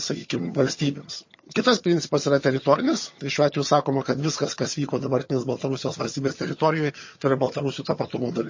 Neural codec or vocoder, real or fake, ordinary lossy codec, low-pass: vocoder, 22.05 kHz, 80 mel bands, HiFi-GAN; fake; MP3, 32 kbps; 7.2 kHz